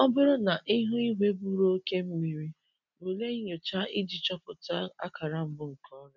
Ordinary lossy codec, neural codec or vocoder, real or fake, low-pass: none; none; real; 7.2 kHz